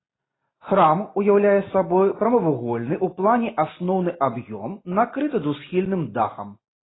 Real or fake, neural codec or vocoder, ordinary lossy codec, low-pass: real; none; AAC, 16 kbps; 7.2 kHz